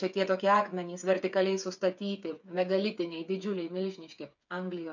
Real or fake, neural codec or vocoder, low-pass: fake; codec, 16 kHz, 8 kbps, FreqCodec, smaller model; 7.2 kHz